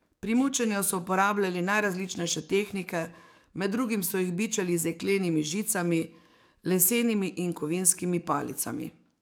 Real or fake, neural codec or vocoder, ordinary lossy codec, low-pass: fake; codec, 44.1 kHz, 7.8 kbps, DAC; none; none